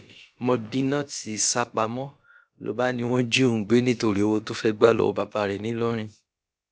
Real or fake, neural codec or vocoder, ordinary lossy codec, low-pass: fake; codec, 16 kHz, about 1 kbps, DyCAST, with the encoder's durations; none; none